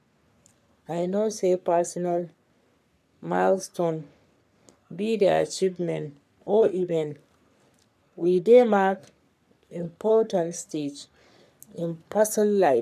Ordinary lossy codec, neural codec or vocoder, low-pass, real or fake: none; codec, 44.1 kHz, 3.4 kbps, Pupu-Codec; 14.4 kHz; fake